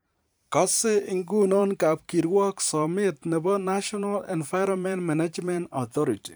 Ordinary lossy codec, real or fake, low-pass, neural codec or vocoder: none; fake; none; vocoder, 44.1 kHz, 128 mel bands every 512 samples, BigVGAN v2